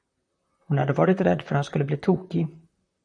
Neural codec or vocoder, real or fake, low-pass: vocoder, 44.1 kHz, 128 mel bands, Pupu-Vocoder; fake; 9.9 kHz